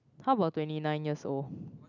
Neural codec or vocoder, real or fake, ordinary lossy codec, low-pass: none; real; none; 7.2 kHz